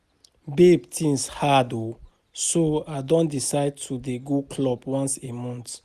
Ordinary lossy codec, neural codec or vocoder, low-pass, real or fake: Opus, 64 kbps; none; 14.4 kHz; real